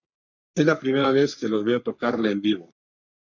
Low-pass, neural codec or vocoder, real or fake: 7.2 kHz; codec, 44.1 kHz, 3.4 kbps, Pupu-Codec; fake